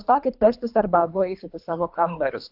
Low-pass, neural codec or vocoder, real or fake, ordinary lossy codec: 5.4 kHz; codec, 24 kHz, 3 kbps, HILCodec; fake; AAC, 48 kbps